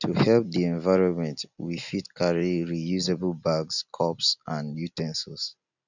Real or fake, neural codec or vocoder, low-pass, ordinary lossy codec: real; none; 7.2 kHz; none